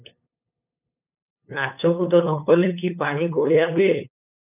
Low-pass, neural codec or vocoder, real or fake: 3.6 kHz; codec, 16 kHz, 8 kbps, FunCodec, trained on LibriTTS, 25 frames a second; fake